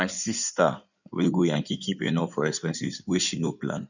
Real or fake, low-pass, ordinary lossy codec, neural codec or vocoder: fake; 7.2 kHz; none; codec, 16 kHz in and 24 kHz out, 2.2 kbps, FireRedTTS-2 codec